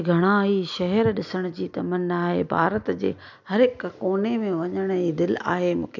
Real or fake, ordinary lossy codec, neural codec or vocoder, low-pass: real; none; none; 7.2 kHz